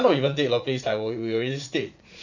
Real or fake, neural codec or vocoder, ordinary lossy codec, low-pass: fake; vocoder, 44.1 kHz, 80 mel bands, Vocos; none; 7.2 kHz